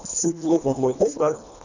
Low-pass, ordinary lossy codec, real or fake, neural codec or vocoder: 7.2 kHz; none; fake; codec, 24 kHz, 1.5 kbps, HILCodec